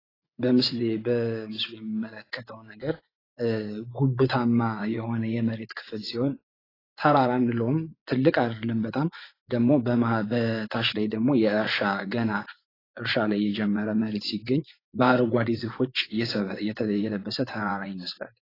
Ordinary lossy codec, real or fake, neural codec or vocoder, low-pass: AAC, 24 kbps; fake; vocoder, 24 kHz, 100 mel bands, Vocos; 5.4 kHz